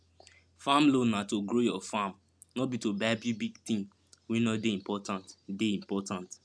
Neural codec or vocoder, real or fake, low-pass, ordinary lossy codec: none; real; none; none